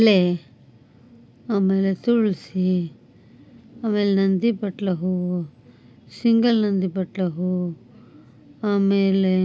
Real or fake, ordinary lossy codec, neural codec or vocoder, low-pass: real; none; none; none